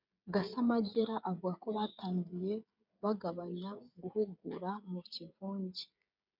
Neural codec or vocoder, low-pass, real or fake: codec, 16 kHz, 16 kbps, FreqCodec, smaller model; 5.4 kHz; fake